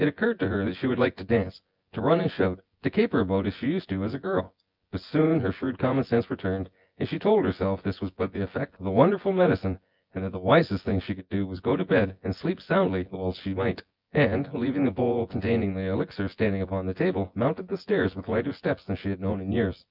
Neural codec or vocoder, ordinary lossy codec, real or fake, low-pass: vocoder, 24 kHz, 100 mel bands, Vocos; Opus, 24 kbps; fake; 5.4 kHz